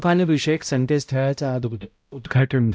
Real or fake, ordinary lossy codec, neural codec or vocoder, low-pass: fake; none; codec, 16 kHz, 0.5 kbps, X-Codec, HuBERT features, trained on balanced general audio; none